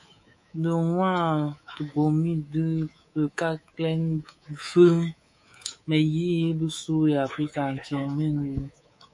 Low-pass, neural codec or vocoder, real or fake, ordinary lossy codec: 10.8 kHz; codec, 24 kHz, 3.1 kbps, DualCodec; fake; MP3, 48 kbps